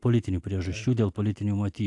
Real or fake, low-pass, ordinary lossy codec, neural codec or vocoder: real; 10.8 kHz; AAC, 64 kbps; none